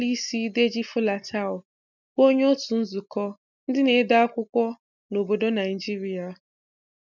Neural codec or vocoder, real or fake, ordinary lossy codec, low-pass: none; real; none; 7.2 kHz